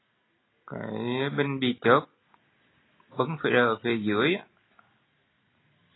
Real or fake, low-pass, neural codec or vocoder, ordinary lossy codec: real; 7.2 kHz; none; AAC, 16 kbps